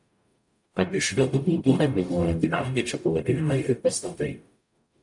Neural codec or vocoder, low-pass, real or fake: codec, 44.1 kHz, 0.9 kbps, DAC; 10.8 kHz; fake